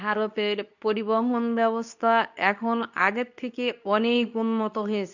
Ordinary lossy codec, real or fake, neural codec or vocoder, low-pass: none; fake; codec, 24 kHz, 0.9 kbps, WavTokenizer, medium speech release version 2; 7.2 kHz